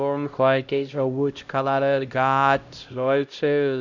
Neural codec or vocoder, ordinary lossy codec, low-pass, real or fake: codec, 16 kHz, 0.5 kbps, X-Codec, HuBERT features, trained on LibriSpeech; none; 7.2 kHz; fake